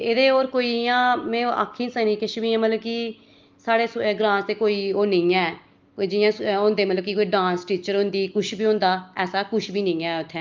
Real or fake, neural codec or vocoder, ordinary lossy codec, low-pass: real; none; Opus, 32 kbps; 7.2 kHz